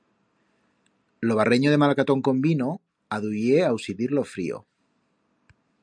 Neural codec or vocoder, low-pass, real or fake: none; 9.9 kHz; real